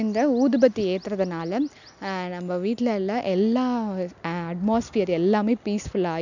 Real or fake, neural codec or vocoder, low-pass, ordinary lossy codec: real; none; 7.2 kHz; none